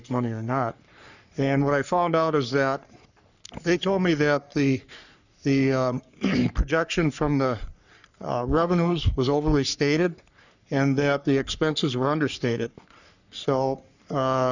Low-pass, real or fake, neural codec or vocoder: 7.2 kHz; fake; codec, 44.1 kHz, 3.4 kbps, Pupu-Codec